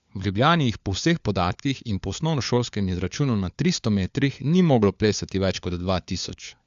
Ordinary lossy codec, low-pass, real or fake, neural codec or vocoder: AAC, 96 kbps; 7.2 kHz; fake; codec, 16 kHz, 4 kbps, FunCodec, trained on LibriTTS, 50 frames a second